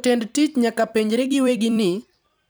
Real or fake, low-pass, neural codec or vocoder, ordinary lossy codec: fake; none; vocoder, 44.1 kHz, 128 mel bands every 256 samples, BigVGAN v2; none